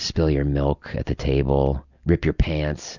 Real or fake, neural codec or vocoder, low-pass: real; none; 7.2 kHz